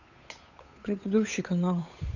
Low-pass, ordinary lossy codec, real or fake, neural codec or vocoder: 7.2 kHz; none; fake; codec, 16 kHz, 8 kbps, FunCodec, trained on Chinese and English, 25 frames a second